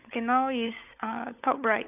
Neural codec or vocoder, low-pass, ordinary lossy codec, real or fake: codec, 16 kHz, 16 kbps, FunCodec, trained on LibriTTS, 50 frames a second; 3.6 kHz; none; fake